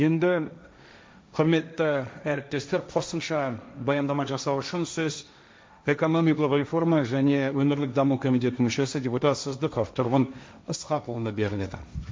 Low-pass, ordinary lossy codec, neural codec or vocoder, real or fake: none; none; codec, 16 kHz, 1.1 kbps, Voila-Tokenizer; fake